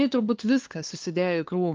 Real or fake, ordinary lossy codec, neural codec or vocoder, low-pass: fake; Opus, 32 kbps; codec, 16 kHz, 2 kbps, FunCodec, trained on LibriTTS, 25 frames a second; 7.2 kHz